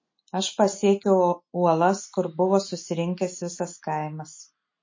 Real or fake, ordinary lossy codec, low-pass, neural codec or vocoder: real; MP3, 32 kbps; 7.2 kHz; none